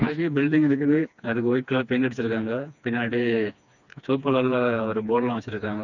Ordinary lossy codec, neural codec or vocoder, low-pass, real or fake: none; codec, 16 kHz, 2 kbps, FreqCodec, smaller model; 7.2 kHz; fake